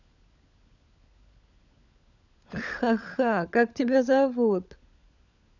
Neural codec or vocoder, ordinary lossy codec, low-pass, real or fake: codec, 16 kHz, 16 kbps, FunCodec, trained on LibriTTS, 50 frames a second; none; 7.2 kHz; fake